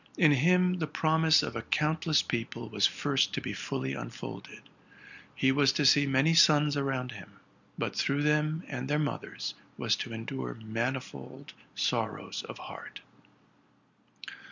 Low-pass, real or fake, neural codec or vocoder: 7.2 kHz; real; none